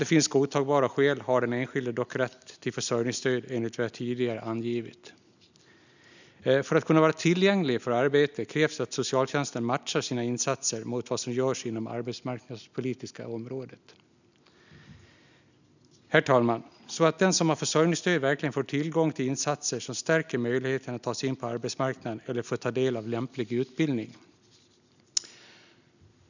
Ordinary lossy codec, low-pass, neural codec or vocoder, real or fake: none; 7.2 kHz; none; real